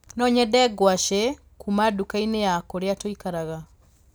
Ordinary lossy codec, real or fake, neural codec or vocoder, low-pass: none; real; none; none